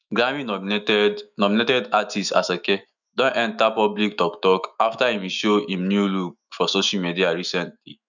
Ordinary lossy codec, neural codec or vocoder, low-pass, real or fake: none; autoencoder, 48 kHz, 128 numbers a frame, DAC-VAE, trained on Japanese speech; 7.2 kHz; fake